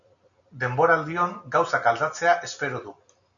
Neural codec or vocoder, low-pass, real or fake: none; 7.2 kHz; real